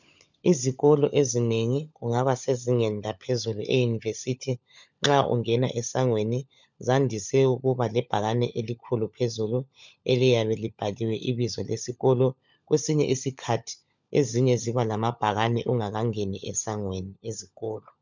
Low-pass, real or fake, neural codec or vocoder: 7.2 kHz; fake; codec, 16 kHz, 16 kbps, FunCodec, trained on LibriTTS, 50 frames a second